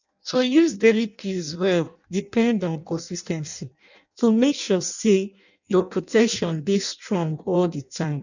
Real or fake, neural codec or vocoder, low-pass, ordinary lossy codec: fake; codec, 16 kHz in and 24 kHz out, 0.6 kbps, FireRedTTS-2 codec; 7.2 kHz; none